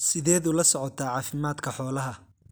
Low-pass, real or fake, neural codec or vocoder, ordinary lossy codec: none; real; none; none